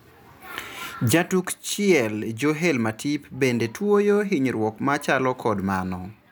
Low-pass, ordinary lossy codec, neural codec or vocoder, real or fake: none; none; none; real